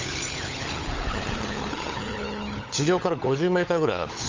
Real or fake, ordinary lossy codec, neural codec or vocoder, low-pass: fake; Opus, 32 kbps; codec, 16 kHz, 16 kbps, FunCodec, trained on LibriTTS, 50 frames a second; 7.2 kHz